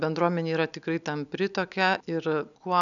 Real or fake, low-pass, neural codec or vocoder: real; 7.2 kHz; none